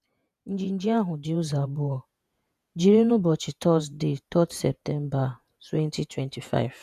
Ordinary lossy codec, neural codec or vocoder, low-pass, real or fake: none; vocoder, 48 kHz, 128 mel bands, Vocos; 14.4 kHz; fake